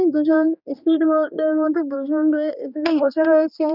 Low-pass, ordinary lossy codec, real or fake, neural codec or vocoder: 5.4 kHz; none; fake; codec, 16 kHz, 2 kbps, X-Codec, HuBERT features, trained on general audio